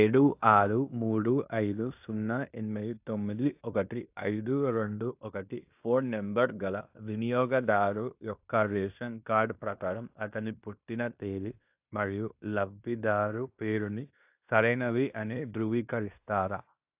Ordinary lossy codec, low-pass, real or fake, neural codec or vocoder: AAC, 32 kbps; 3.6 kHz; fake; codec, 16 kHz in and 24 kHz out, 0.9 kbps, LongCat-Audio-Codec, fine tuned four codebook decoder